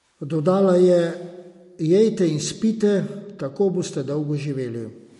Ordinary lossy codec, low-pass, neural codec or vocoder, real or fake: MP3, 48 kbps; 14.4 kHz; none; real